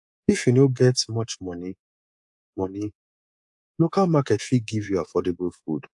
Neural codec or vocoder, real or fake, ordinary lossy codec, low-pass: codec, 24 kHz, 3.1 kbps, DualCodec; fake; AAC, 64 kbps; 10.8 kHz